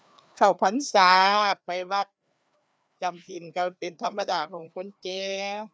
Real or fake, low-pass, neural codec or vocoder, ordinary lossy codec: fake; none; codec, 16 kHz, 2 kbps, FreqCodec, larger model; none